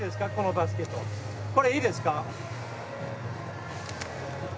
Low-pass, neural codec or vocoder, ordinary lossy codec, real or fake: none; none; none; real